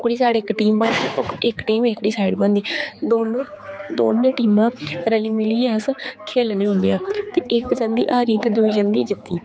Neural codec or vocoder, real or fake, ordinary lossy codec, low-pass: codec, 16 kHz, 4 kbps, X-Codec, HuBERT features, trained on general audio; fake; none; none